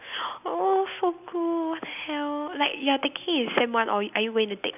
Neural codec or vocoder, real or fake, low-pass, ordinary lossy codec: none; real; 3.6 kHz; none